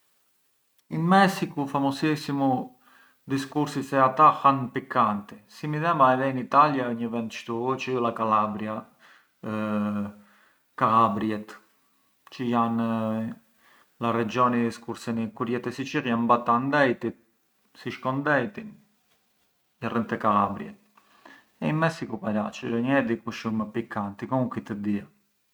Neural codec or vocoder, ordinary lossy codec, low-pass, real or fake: none; none; none; real